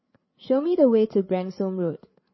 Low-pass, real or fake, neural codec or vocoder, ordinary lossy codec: 7.2 kHz; fake; codec, 16 kHz, 8 kbps, FreqCodec, larger model; MP3, 24 kbps